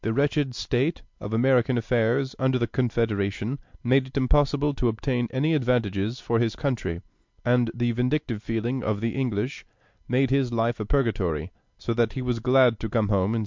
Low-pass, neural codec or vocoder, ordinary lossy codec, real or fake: 7.2 kHz; none; MP3, 64 kbps; real